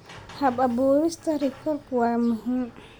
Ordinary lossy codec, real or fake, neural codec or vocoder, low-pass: none; real; none; none